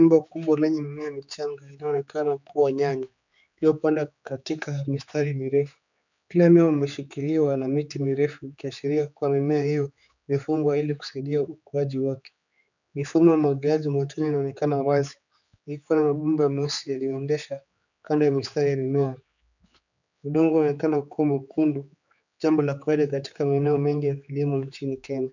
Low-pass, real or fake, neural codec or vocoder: 7.2 kHz; fake; codec, 16 kHz, 4 kbps, X-Codec, HuBERT features, trained on general audio